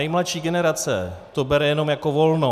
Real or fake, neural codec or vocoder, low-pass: real; none; 14.4 kHz